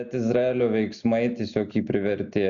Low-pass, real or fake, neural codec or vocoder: 7.2 kHz; real; none